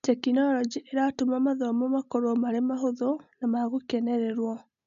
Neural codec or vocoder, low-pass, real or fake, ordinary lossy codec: none; 7.2 kHz; real; none